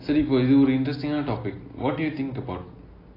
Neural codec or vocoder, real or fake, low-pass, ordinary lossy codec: none; real; 5.4 kHz; AAC, 24 kbps